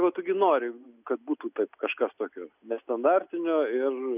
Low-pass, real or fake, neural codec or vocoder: 3.6 kHz; real; none